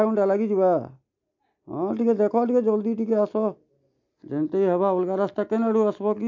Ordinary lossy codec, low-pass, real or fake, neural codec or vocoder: MP3, 64 kbps; 7.2 kHz; real; none